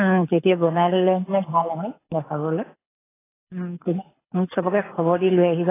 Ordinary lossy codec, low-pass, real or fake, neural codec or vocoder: AAC, 16 kbps; 3.6 kHz; fake; codec, 24 kHz, 6 kbps, HILCodec